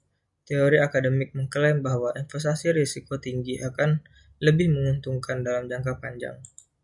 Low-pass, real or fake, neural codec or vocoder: 10.8 kHz; real; none